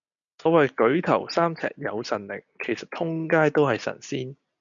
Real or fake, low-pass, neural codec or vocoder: real; 7.2 kHz; none